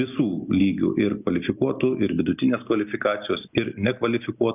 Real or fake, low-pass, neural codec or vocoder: real; 3.6 kHz; none